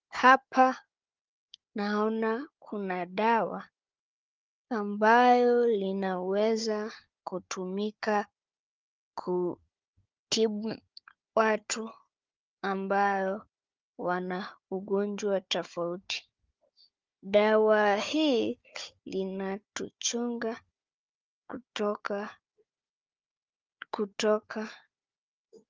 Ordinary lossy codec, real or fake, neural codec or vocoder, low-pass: Opus, 32 kbps; fake; codec, 16 kHz, 4 kbps, FunCodec, trained on Chinese and English, 50 frames a second; 7.2 kHz